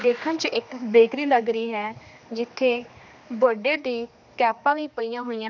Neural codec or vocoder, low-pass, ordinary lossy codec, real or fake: codec, 16 kHz, 2 kbps, X-Codec, HuBERT features, trained on general audio; 7.2 kHz; none; fake